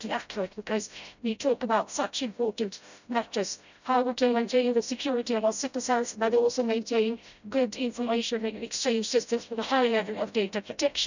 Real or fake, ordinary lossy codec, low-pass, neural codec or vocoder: fake; none; 7.2 kHz; codec, 16 kHz, 0.5 kbps, FreqCodec, smaller model